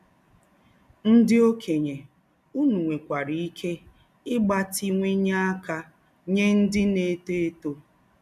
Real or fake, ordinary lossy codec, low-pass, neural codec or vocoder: real; none; 14.4 kHz; none